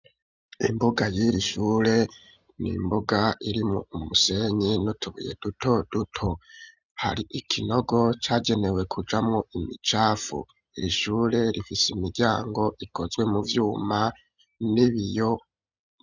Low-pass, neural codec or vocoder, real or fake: 7.2 kHz; none; real